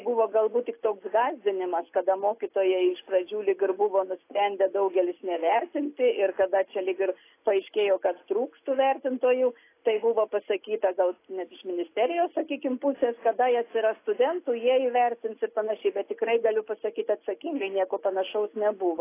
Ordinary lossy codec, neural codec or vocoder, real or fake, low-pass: AAC, 24 kbps; none; real; 3.6 kHz